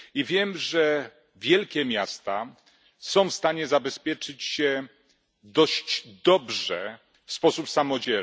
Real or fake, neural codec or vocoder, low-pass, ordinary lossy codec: real; none; none; none